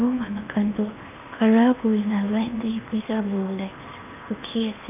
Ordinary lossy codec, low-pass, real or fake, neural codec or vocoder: none; 3.6 kHz; fake; codec, 16 kHz in and 24 kHz out, 0.8 kbps, FocalCodec, streaming, 65536 codes